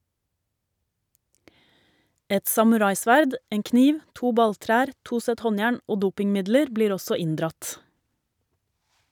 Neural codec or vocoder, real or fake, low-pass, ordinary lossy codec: none; real; 19.8 kHz; none